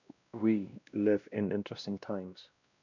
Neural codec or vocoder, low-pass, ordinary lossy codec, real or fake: codec, 16 kHz, 1 kbps, X-Codec, WavLM features, trained on Multilingual LibriSpeech; 7.2 kHz; none; fake